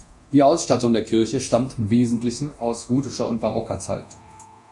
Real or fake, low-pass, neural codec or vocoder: fake; 10.8 kHz; codec, 24 kHz, 0.9 kbps, DualCodec